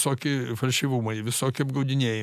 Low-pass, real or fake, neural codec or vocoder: 14.4 kHz; real; none